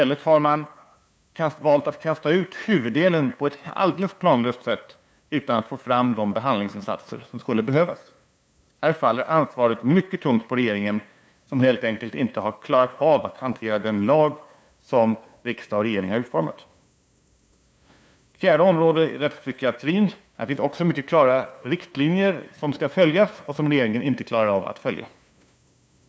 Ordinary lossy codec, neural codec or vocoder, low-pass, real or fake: none; codec, 16 kHz, 2 kbps, FunCodec, trained on LibriTTS, 25 frames a second; none; fake